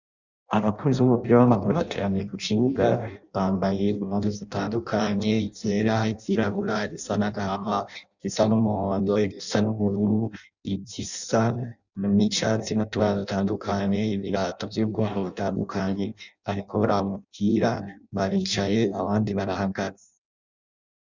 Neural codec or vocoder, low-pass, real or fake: codec, 16 kHz in and 24 kHz out, 0.6 kbps, FireRedTTS-2 codec; 7.2 kHz; fake